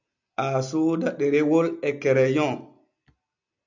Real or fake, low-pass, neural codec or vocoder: real; 7.2 kHz; none